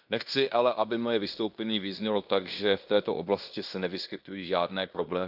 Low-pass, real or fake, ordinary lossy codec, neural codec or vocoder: 5.4 kHz; fake; none; codec, 16 kHz in and 24 kHz out, 0.9 kbps, LongCat-Audio-Codec, fine tuned four codebook decoder